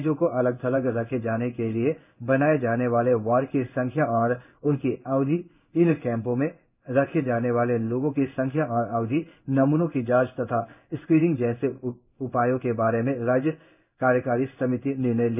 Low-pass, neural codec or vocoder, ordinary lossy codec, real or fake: 3.6 kHz; codec, 16 kHz in and 24 kHz out, 1 kbps, XY-Tokenizer; none; fake